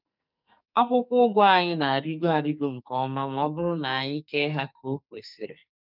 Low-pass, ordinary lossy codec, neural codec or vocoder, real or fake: 5.4 kHz; none; codec, 44.1 kHz, 2.6 kbps, SNAC; fake